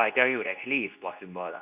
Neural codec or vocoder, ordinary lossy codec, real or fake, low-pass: codec, 24 kHz, 0.9 kbps, WavTokenizer, medium speech release version 2; none; fake; 3.6 kHz